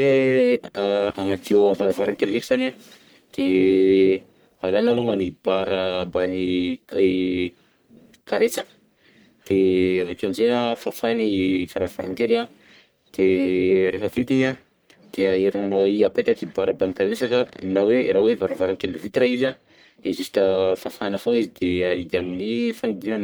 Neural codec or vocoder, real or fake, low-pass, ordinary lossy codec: codec, 44.1 kHz, 1.7 kbps, Pupu-Codec; fake; none; none